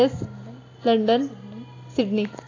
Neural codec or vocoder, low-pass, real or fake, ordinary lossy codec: none; 7.2 kHz; real; AAC, 32 kbps